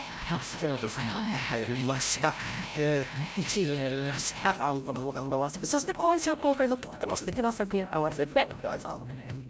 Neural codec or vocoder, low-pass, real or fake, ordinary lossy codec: codec, 16 kHz, 0.5 kbps, FreqCodec, larger model; none; fake; none